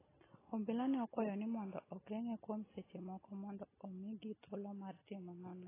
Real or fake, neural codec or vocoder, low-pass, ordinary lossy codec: real; none; 3.6 kHz; AAC, 16 kbps